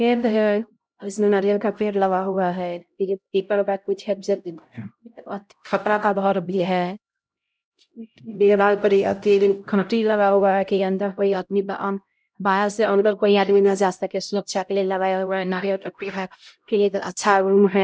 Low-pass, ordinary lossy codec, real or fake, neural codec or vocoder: none; none; fake; codec, 16 kHz, 0.5 kbps, X-Codec, HuBERT features, trained on LibriSpeech